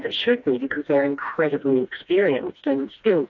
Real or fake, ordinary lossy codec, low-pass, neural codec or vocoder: fake; AAC, 48 kbps; 7.2 kHz; codec, 16 kHz, 1 kbps, FreqCodec, smaller model